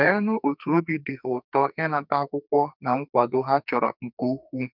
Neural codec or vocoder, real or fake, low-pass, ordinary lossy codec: codec, 44.1 kHz, 2.6 kbps, SNAC; fake; 5.4 kHz; none